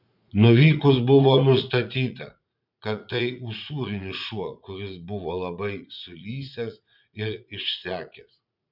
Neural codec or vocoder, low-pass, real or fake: vocoder, 44.1 kHz, 80 mel bands, Vocos; 5.4 kHz; fake